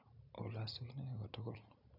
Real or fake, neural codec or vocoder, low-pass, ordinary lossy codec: real; none; 5.4 kHz; none